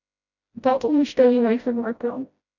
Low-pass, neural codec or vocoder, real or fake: 7.2 kHz; codec, 16 kHz, 0.5 kbps, FreqCodec, smaller model; fake